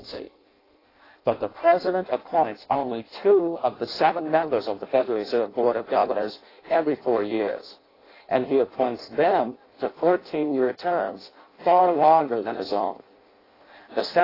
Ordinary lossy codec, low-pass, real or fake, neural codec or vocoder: AAC, 24 kbps; 5.4 kHz; fake; codec, 16 kHz in and 24 kHz out, 0.6 kbps, FireRedTTS-2 codec